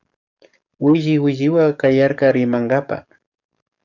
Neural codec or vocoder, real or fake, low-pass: codec, 44.1 kHz, 7.8 kbps, DAC; fake; 7.2 kHz